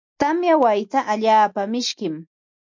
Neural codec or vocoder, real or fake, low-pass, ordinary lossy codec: none; real; 7.2 kHz; MP3, 48 kbps